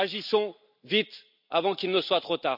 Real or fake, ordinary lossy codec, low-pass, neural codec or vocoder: real; none; 5.4 kHz; none